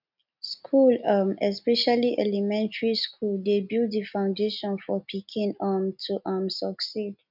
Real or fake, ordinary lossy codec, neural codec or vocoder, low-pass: real; none; none; 5.4 kHz